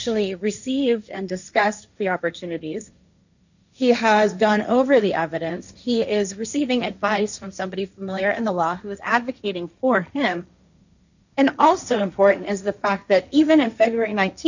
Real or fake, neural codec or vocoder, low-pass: fake; codec, 16 kHz, 1.1 kbps, Voila-Tokenizer; 7.2 kHz